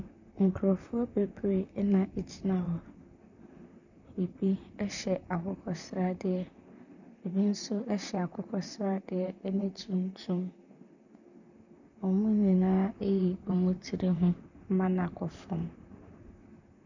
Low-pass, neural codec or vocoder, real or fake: 7.2 kHz; vocoder, 44.1 kHz, 128 mel bands, Pupu-Vocoder; fake